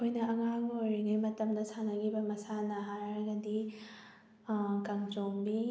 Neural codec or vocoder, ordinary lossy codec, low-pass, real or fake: none; none; none; real